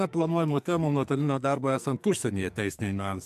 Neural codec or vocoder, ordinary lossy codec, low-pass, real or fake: codec, 44.1 kHz, 2.6 kbps, SNAC; MP3, 96 kbps; 14.4 kHz; fake